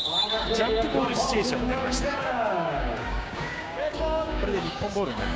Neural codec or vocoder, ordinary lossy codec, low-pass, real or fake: codec, 16 kHz, 6 kbps, DAC; none; none; fake